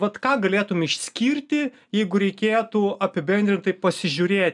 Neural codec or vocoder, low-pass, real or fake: none; 10.8 kHz; real